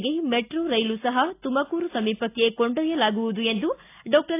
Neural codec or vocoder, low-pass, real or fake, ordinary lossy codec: none; 3.6 kHz; real; AAC, 24 kbps